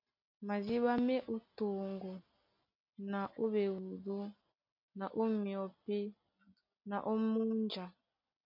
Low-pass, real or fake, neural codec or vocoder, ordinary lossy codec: 5.4 kHz; real; none; MP3, 48 kbps